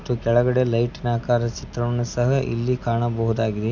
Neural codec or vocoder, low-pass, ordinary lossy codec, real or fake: none; 7.2 kHz; none; real